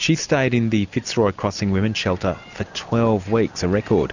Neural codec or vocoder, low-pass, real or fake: none; 7.2 kHz; real